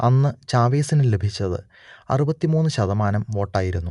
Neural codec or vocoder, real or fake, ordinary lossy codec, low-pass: none; real; none; 10.8 kHz